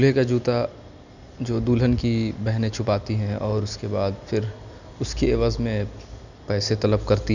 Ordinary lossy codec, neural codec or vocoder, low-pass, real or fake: none; none; 7.2 kHz; real